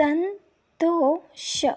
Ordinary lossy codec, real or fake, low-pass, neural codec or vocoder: none; real; none; none